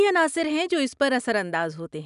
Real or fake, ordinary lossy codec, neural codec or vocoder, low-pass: real; none; none; 10.8 kHz